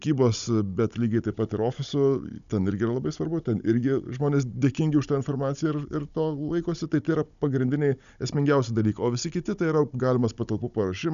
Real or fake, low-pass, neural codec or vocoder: fake; 7.2 kHz; codec, 16 kHz, 16 kbps, FunCodec, trained on Chinese and English, 50 frames a second